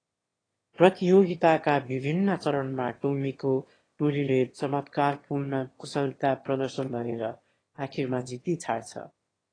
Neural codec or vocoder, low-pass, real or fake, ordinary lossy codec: autoencoder, 22.05 kHz, a latent of 192 numbers a frame, VITS, trained on one speaker; 9.9 kHz; fake; AAC, 32 kbps